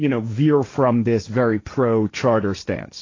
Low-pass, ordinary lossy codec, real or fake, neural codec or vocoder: 7.2 kHz; AAC, 32 kbps; fake; codec, 16 kHz, 1.1 kbps, Voila-Tokenizer